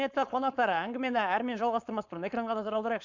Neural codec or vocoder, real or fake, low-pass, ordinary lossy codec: codec, 16 kHz, 4.8 kbps, FACodec; fake; 7.2 kHz; MP3, 64 kbps